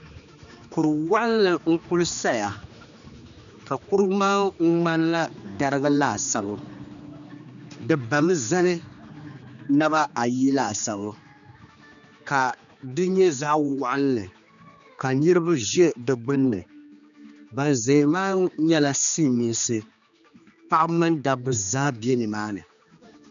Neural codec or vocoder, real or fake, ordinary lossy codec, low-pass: codec, 16 kHz, 2 kbps, X-Codec, HuBERT features, trained on general audio; fake; MP3, 96 kbps; 7.2 kHz